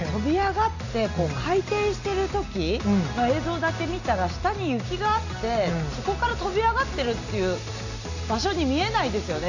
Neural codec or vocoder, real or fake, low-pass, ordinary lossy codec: none; real; 7.2 kHz; none